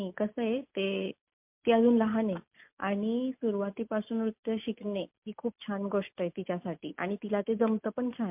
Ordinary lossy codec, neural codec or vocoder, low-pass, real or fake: MP3, 32 kbps; none; 3.6 kHz; real